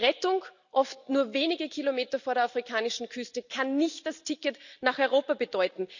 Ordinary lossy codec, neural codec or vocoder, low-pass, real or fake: none; none; 7.2 kHz; real